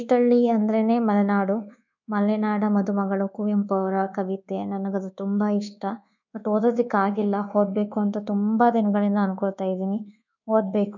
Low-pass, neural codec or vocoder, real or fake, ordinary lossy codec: 7.2 kHz; codec, 24 kHz, 1.2 kbps, DualCodec; fake; none